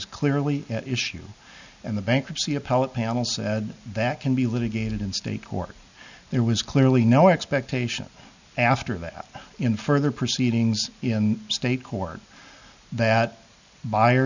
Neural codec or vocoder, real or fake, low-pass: none; real; 7.2 kHz